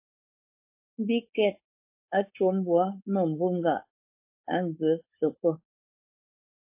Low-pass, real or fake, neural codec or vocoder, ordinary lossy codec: 3.6 kHz; fake; codec, 16 kHz, 4.8 kbps, FACodec; MP3, 24 kbps